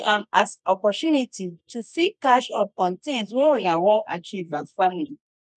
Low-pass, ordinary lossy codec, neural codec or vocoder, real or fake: none; none; codec, 24 kHz, 0.9 kbps, WavTokenizer, medium music audio release; fake